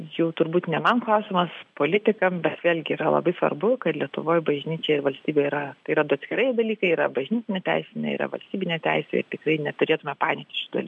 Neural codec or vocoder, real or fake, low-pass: none; real; 10.8 kHz